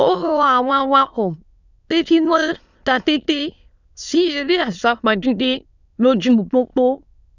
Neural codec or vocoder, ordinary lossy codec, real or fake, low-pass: autoencoder, 22.05 kHz, a latent of 192 numbers a frame, VITS, trained on many speakers; none; fake; 7.2 kHz